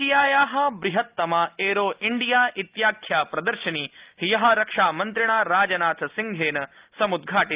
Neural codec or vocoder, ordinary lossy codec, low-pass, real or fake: none; Opus, 32 kbps; 3.6 kHz; real